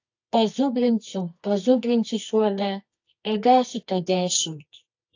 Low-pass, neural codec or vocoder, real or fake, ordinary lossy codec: 7.2 kHz; codec, 24 kHz, 0.9 kbps, WavTokenizer, medium music audio release; fake; AAC, 48 kbps